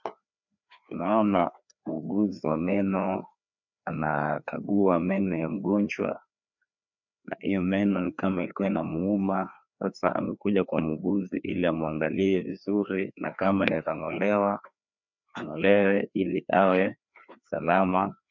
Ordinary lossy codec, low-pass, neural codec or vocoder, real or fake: MP3, 64 kbps; 7.2 kHz; codec, 16 kHz, 2 kbps, FreqCodec, larger model; fake